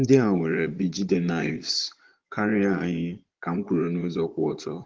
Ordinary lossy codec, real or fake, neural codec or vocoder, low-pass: Opus, 16 kbps; fake; vocoder, 22.05 kHz, 80 mel bands, Vocos; 7.2 kHz